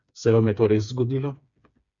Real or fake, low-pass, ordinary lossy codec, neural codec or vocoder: fake; 7.2 kHz; MP3, 64 kbps; codec, 16 kHz, 4 kbps, FreqCodec, smaller model